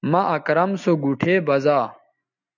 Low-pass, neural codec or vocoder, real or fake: 7.2 kHz; none; real